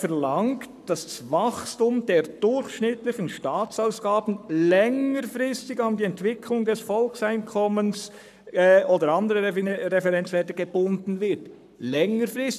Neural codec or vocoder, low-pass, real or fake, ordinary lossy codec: codec, 44.1 kHz, 7.8 kbps, Pupu-Codec; 14.4 kHz; fake; none